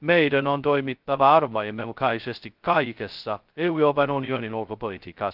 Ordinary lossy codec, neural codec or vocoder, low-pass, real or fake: Opus, 32 kbps; codec, 16 kHz, 0.2 kbps, FocalCodec; 5.4 kHz; fake